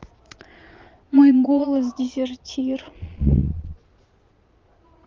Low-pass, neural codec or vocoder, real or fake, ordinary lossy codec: 7.2 kHz; vocoder, 22.05 kHz, 80 mel bands, Vocos; fake; Opus, 32 kbps